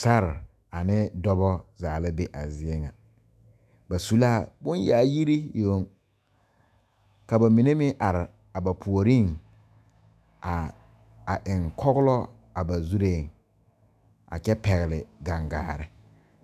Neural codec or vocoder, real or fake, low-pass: autoencoder, 48 kHz, 128 numbers a frame, DAC-VAE, trained on Japanese speech; fake; 14.4 kHz